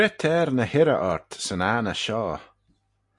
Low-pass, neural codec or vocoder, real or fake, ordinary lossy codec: 10.8 kHz; none; real; MP3, 96 kbps